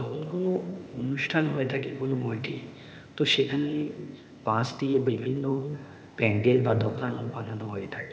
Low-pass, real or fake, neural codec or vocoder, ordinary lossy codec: none; fake; codec, 16 kHz, 0.8 kbps, ZipCodec; none